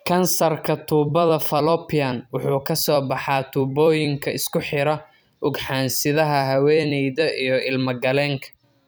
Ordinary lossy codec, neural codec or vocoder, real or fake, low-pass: none; vocoder, 44.1 kHz, 128 mel bands every 256 samples, BigVGAN v2; fake; none